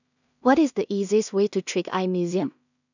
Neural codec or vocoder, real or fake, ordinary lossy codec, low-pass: codec, 16 kHz in and 24 kHz out, 0.4 kbps, LongCat-Audio-Codec, two codebook decoder; fake; none; 7.2 kHz